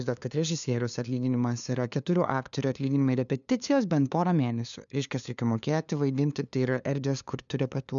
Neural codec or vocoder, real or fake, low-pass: codec, 16 kHz, 2 kbps, FunCodec, trained on LibriTTS, 25 frames a second; fake; 7.2 kHz